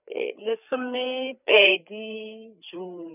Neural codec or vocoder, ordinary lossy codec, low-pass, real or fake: codec, 16 kHz, 4 kbps, FreqCodec, larger model; none; 3.6 kHz; fake